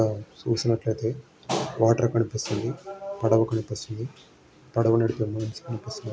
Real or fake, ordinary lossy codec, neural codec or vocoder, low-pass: real; none; none; none